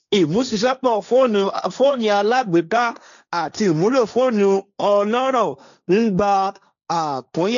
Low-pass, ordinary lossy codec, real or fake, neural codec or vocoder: 7.2 kHz; MP3, 96 kbps; fake; codec, 16 kHz, 1.1 kbps, Voila-Tokenizer